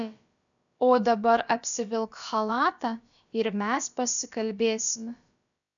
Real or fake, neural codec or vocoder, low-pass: fake; codec, 16 kHz, about 1 kbps, DyCAST, with the encoder's durations; 7.2 kHz